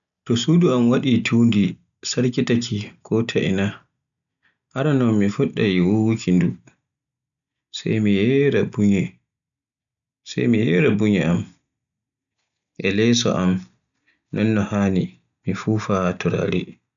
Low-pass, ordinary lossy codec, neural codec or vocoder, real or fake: 7.2 kHz; none; none; real